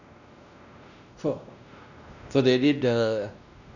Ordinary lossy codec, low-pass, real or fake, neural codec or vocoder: none; 7.2 kHz; fake; codec, 16 kHz, 1 kbps, X-Codec, WavLM features, trained on Multilingual LibriSpeech